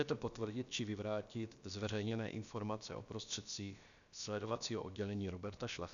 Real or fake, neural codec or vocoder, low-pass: fake; codec, 16 kHz, about 1 kbps, DyCAST, with the encoder's durations; 7.2 kHz